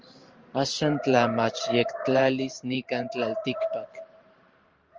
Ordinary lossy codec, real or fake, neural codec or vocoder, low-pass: Opus, 24 kbps; fake; vocoder, 44.1 kHz, 128 mel bands every 512 samples, BigVGAN v2; 7.2 kHz